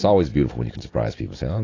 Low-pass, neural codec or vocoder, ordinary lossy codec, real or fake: 7.2 kHz; none; AAC, 32 kbps; real